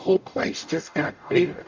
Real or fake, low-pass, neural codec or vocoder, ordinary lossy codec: fake; 7.2 kHz; codec, 44.1 kHz, 0.9 kbps, DAC; MP3, 64 kbps